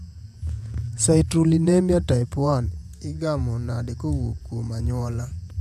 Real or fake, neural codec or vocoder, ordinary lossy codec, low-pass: fake; vocoder, 48 kHz, 128 mel bands, Vocos; AAC, 96 kbps; 14.4 kHz